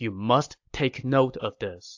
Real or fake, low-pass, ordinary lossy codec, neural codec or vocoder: real; 7.2 kHz; AAC, 48 kbps; none